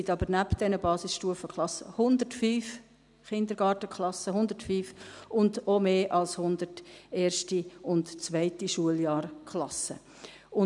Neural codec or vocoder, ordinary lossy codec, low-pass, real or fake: none; none; 10.8 kHz; real